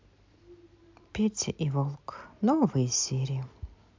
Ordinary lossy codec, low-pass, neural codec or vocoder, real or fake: MP3, 64 kbps; 7.2 kHz; none; real